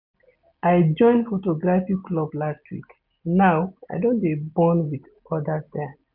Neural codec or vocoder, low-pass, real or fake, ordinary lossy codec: none; 5.4 kHz; real; none